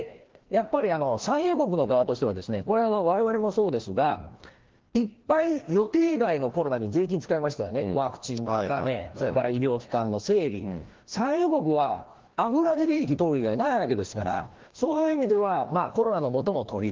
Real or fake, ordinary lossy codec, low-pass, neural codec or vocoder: fake; Opus, 16 kbps; 7.2 kHz; codec, 16 kHz, 1 kbps, FreqCodec, larger model